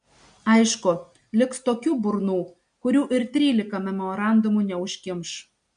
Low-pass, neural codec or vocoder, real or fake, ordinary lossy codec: 9.9 kHz; none; real; MP3, 64 kbps